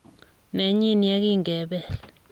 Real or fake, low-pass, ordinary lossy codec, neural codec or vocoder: real; 19.8 kHz; Opus, 32 kbps; none